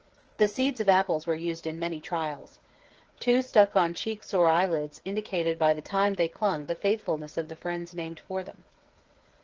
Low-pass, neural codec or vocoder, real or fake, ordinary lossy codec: 7.2 kHz; codec, 16 kHz, 8 kbps, FreqCodec, smaller model; fake; Opus, 16 kbps